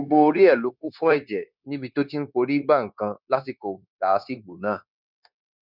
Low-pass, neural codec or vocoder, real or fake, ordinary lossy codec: 5.4 kHz; codec, 16 kHz, 0.9 kbps, LongCat-Audio-Codec; fake; none